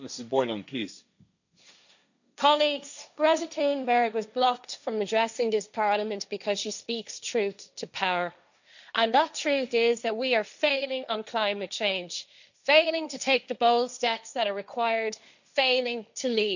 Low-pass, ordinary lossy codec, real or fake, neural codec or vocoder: 7.2 kHz; none; fake; codec, 16 kHz, 1.1 kbps, Voila-Tokenizer